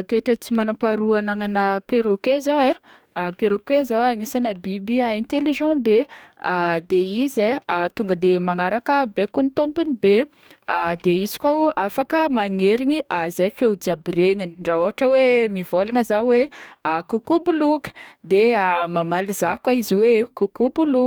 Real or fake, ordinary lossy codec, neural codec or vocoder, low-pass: fake; none; codec, 44.1 kHz, 2.6 kbps, DAC; none